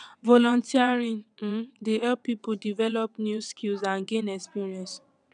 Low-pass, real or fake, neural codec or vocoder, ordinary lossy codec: 9.9 kHz; fake; vocoder, 22.05 kHz, 80 mel bands, WaveNeXt; none